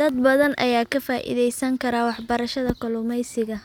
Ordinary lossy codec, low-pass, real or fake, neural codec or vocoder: none; 19.8 kHz; real; none